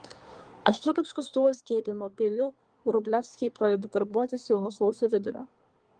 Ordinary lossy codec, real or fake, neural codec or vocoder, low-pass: Opus, 24 kbps; fake; codec, 24 kHz, 1 kbps, SNAC; 9.9 kHz